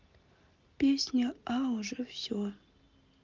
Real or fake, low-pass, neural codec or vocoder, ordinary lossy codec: real; 7.2 kHz; none; Opus, 32 kbps